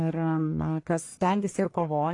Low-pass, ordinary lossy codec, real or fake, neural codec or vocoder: 10.8 kHz; AAC, 48 kbps; fake; codec, 44.1 kHz, 1.7 kbps, Pupu-Codec